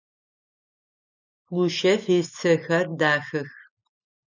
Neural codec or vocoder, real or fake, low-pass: none; real; 7.2 kHz